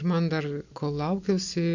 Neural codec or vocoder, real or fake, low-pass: none; real; 7.2 kHz